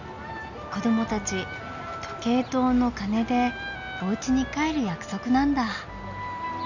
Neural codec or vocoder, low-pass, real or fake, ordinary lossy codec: none; 7.2 kHz; real; none